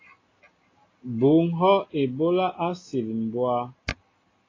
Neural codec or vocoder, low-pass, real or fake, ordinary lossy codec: none; 7.2 kHz; real; AAC, 32 kbps